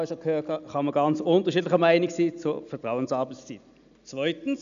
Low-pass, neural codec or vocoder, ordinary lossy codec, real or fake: 7.2 kHz; none; MP3, 96 kbps; real